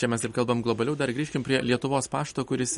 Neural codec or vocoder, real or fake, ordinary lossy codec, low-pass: none; real; MP3, 48 kbps; 19.8 kHz